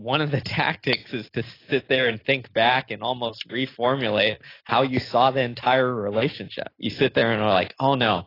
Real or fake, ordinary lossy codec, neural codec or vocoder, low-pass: real; AAC, 24 kbps; none; 5.4 kHz